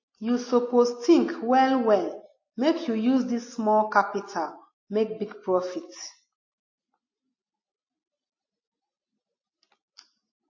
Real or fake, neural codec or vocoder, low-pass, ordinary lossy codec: real; none; 7.2 kHz; MP3, 32 kbps